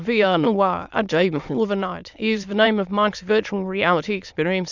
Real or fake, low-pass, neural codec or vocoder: fake; 7.2 kHz; autoencoder, 22.05 kHz, a latent of 192 numbers a frame, VITS, trained on many speakers